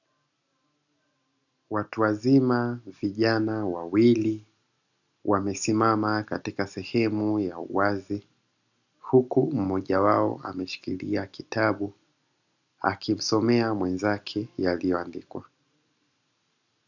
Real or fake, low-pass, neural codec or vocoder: real; 7.2 kHz; none